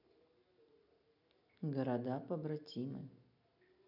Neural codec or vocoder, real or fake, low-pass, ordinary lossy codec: none; real; 5.4 kHz; none